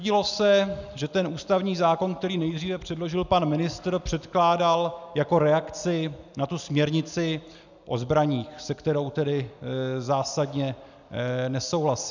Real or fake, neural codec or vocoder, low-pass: real; none; 7.2 kHz